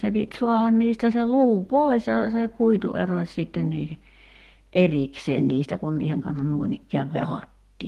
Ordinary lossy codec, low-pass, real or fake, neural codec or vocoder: Opus, 24 kbps; 14.4 kHz; fake; codec, 32 kHz, 1.9 kbps, SNAC